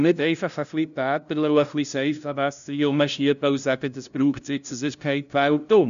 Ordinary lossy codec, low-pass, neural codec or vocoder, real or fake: none; 7.2 kHz; codec, 16 kHz, 0.5 kbps, FunCodec, trained on LibriTTS, 25 frames a second; fake